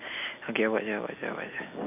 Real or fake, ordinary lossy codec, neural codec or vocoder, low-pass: real; none; none; 3.6 kHz